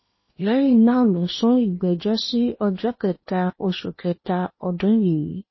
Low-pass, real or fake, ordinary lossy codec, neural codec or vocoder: 7.2 kHz; fake; MP3, 24 kbps; codec, 16 kHz in and 24 kHz out, 0.8 kbps, FocalCodec, streaming, 65536 codes